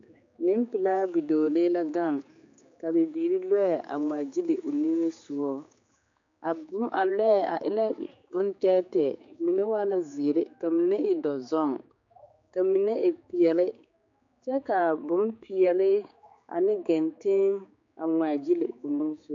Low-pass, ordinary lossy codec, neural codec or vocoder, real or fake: 7.2 kHz; AAC, 64 kbps; codec, 16 kHz, 4 kbps, X-Codec, HuBERT features, trained on general audio; fake